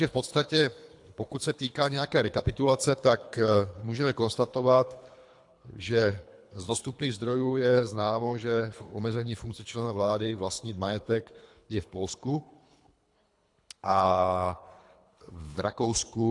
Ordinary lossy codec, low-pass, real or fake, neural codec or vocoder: AAC, 64 kbps; 10.8 kHz; fake; codec, 24 kHz, 3 kbps, HILCodec